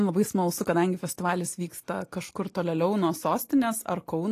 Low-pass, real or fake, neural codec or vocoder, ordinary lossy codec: 14.4 kHz; real; none; AAC, 48 kbps